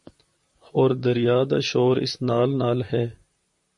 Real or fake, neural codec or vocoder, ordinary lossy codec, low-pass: fake; vocoder, 44.1 kHz, 128 mel bands, Pupu-Vocoder; MP3, 48 kbps; 10.8 kHz